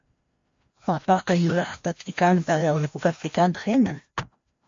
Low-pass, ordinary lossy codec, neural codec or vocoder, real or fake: 7.2 kHz; MP3, 48 kbps; codec, 16 kHz, 1 kbps, FreqCodec, larger model; fake